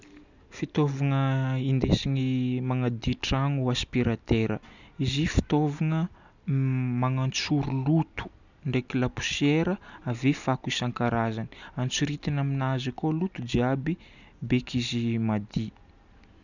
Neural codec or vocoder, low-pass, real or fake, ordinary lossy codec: none; 7.2 kHz; real; none